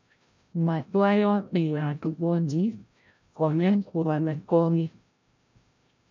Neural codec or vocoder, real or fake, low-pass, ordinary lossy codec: codec, 16 kHz, 0.5 kbps, FreqCodec, larger model; fake; 7.2 kHz; MP3, 64 kbps